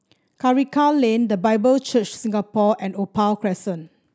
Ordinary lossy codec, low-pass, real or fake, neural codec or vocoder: none; none; real; none